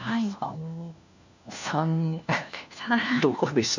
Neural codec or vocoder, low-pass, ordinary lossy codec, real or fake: codec, 16 kHz, 1 kbps, FunCodec, trained on LibriTTS, 50 frames a second; 7.2 kHz; none; fake